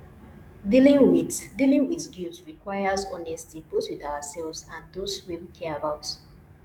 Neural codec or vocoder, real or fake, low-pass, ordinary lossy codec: codec, 44.1 kHz, 7.8 kbps, DAC; fake; 19.8 kHz; none